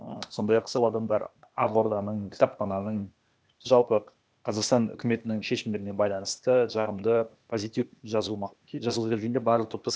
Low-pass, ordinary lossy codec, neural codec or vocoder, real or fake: none; none; codec, 16 kHz, 0.8 kbps, ZipCodec; fake